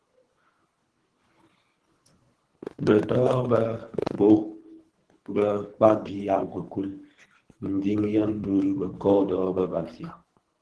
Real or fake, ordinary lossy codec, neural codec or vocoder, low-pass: fake; Opus, 16 kbps; codec, 24 kHz, 3 kbps, HILCodec; 10.8 kHz